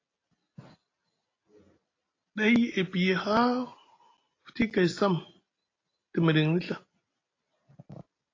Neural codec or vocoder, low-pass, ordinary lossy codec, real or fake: none; 7.2 kHz; AAC, 32 kbps; real